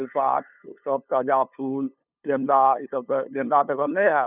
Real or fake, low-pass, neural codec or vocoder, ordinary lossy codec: fake; 3.6 kHz; codec, 16 kHz, 2 kbps, FunCodec, trained on LibriTTS, 25 frames a second; none